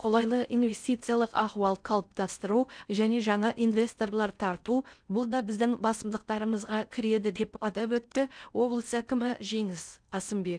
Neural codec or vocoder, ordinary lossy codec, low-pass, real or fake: codec, 16 kHz in and 24 kHz out, 0.6 kbps, FocalCodec, streaming, 4096 codes; none; 9.9 kHz; fake